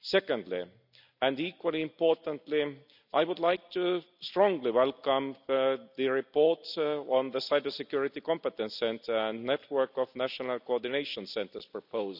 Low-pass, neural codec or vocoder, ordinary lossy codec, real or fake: 5.4 kHz; none; none; real